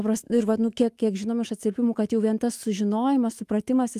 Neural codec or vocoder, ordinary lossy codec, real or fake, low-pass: autoencoder, 48 kHz, 128 numbers a frame, DAC-VAE, trained on Japanese speech; Opus, 32 kbps; fake; 14.4 kHz